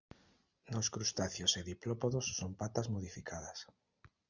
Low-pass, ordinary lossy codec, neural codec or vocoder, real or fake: 7.2 kHz; Opus, 64 kbps; none; real